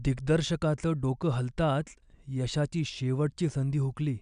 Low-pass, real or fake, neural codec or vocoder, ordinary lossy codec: 9.9 kHz; real; none; MP3, 96 kbps